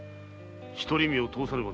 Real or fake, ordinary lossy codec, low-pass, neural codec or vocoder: real; none; none; none